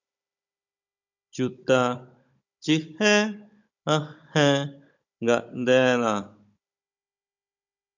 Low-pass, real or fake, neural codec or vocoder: 7.2 kHz; fake; codec, 16 kHz, 16 kbps, FunCodec, trained on Chinese and English, 50 frames a second